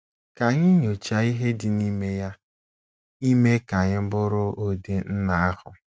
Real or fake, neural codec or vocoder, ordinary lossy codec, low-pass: real; none; none; none